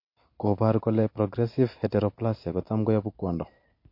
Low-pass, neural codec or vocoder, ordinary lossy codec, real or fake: 5.4 kHz; none; MP3, 32 kbps; real